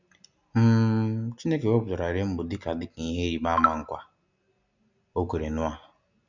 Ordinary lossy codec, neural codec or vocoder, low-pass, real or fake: none; none; 7.2 kHz; real